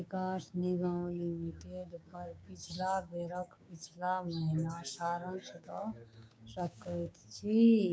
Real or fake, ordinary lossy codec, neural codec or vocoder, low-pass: fake; none; codec, 16 kHz, 6 kbps, DAC; none